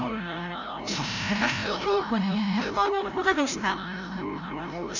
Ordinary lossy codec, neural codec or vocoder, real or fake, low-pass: none; codec, 16 kHz, 0.5 kbps, FreqCodec, larger model; fake; 7.2 kHz